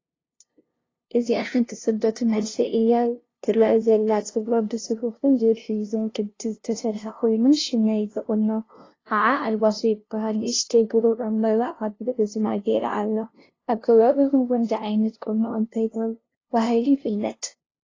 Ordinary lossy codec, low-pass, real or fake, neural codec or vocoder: AAC, 32 kbps; 7.2 kHz; fake; codec, 16 kHz, 0.5 kbps, FunCodec, trained on LibriTTS, 25 frames a second